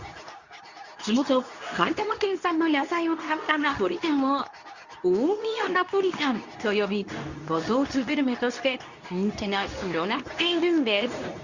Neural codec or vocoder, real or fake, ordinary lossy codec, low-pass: codec, 24 kHz, 0.9 kbps, WavTokenizer, medium speech release version 2; fake; Opus, 64 kbps; 7.2 kHz